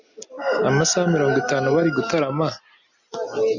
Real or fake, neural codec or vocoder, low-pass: real; none; 7.2 kHz